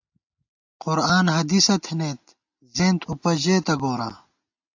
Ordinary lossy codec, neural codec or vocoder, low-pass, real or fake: AAC, 48 kbps; none; 7.2 kHz; real